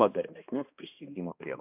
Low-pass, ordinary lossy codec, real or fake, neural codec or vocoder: 3.6 kHz; AAC, 32 kbps; fake; codec, 16 kHz, 1 kbps, X-Codec, HuBERT features, trained on balanced general audio